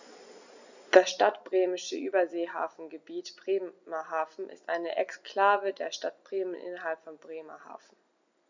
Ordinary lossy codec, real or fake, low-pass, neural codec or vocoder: none; real; 7.2 kHz; none